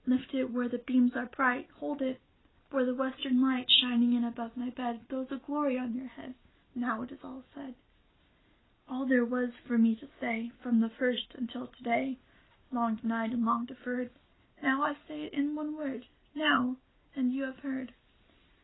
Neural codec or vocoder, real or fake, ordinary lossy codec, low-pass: none; real; AAC, 16 kbps; 7.2 kHz